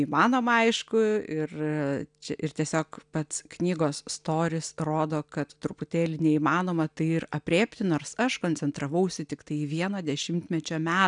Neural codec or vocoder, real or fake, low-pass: none; real; 9.9 kHz